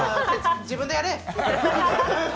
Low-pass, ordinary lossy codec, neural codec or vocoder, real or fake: none; none; none; real